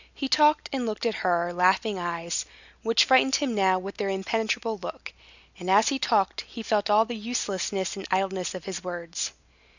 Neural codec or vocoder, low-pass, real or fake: none; 7.2 kHz; real